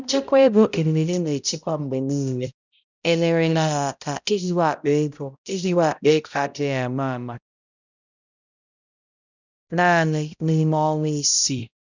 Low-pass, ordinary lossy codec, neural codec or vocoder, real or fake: 7.2 kHz; none; codec, 16 kHz, 0.5 kbps, X-Codec, HuBERT features, trained on balanced general audio; fake